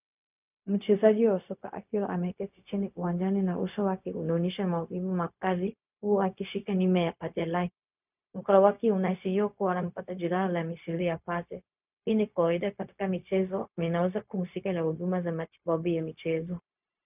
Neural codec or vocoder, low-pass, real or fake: codec, 16 kHz, 0.4 kbps, LongCat-Audio-Codec; 3.6 kHz; fake